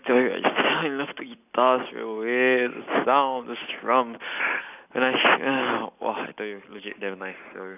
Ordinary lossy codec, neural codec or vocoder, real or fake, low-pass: none; none; real; 3.6 kHz